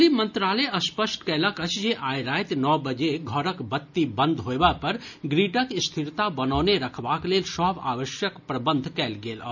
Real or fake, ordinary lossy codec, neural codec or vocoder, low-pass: real; none; none; 7.2 kHz